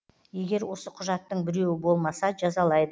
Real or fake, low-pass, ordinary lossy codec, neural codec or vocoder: real; none; none; none